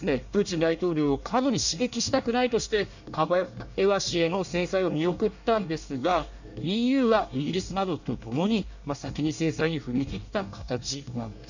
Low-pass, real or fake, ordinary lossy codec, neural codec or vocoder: 7.2 kHz; fake; none; codec, 24 kHz, 1 kbps, SNAC